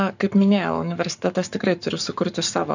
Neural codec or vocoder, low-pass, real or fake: codec, 44.1 kHz, 7.8 kbps, Pupu-Codec; 7.2 kHz; fake